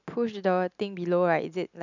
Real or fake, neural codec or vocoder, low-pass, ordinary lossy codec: real; none; 7.2 kHz; none